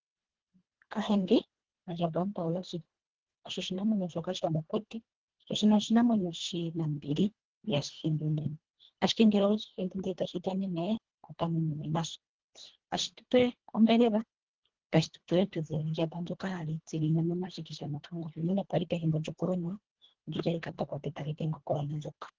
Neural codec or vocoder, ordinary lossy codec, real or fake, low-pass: codec, 24 kHz, 1.5 kbps, HILCodec; Opus, 16 kbps; fake; 7.2 kHz